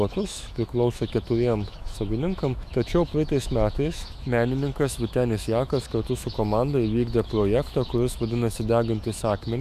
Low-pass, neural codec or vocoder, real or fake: 14.4 kHz; autoencoder, 48 kHz, 128 numbers a frame, DAC-VAE, trained on Japanese speech; fake